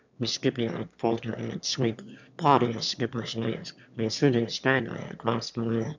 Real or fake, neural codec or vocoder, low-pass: fake; autoencoder, 22.05 kHz, a latent of 192 numbers a frame, VITS, trained on one speaker; 7.2 kHz